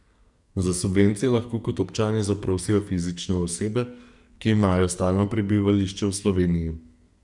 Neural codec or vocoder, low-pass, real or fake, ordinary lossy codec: codec, 44.1 kHz, 2.6 kbps, SNAC; 10.8 kHz; fake; none